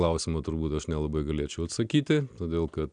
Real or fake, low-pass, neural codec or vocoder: real; 9.9 kHz; none